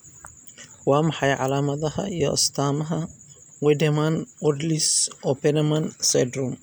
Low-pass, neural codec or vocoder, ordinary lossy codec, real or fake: none; none; none; real